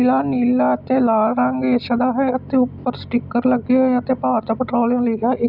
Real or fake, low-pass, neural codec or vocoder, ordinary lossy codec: real; 5.4 kHz; none; none